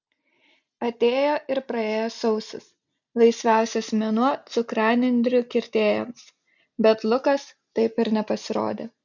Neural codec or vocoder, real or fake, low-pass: none; real; 7.2 kHz